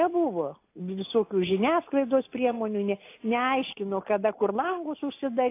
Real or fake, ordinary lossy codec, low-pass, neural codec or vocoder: real; AAC, 24 kbps; 3.6 kHz; none